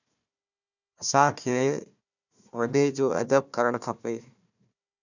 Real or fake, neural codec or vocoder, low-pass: fake; codec, 16 kHz, 1 kbps, FunCodec, trained on Chinese and English, 50 frames a second; 7.2 kHz